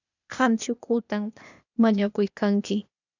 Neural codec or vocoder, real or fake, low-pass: codec, 16 kHz, 0.8 kbps, ZipCodec; fake; 7.2 kHz